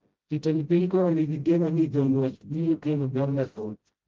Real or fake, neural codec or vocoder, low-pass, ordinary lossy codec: fake; codec, 16 kHz, 0.5 kbps, FreqCodec, smaller model; 7.2 kHz; Opus, 32 kbps